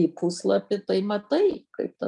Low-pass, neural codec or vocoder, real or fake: 10.8 kHz; none; real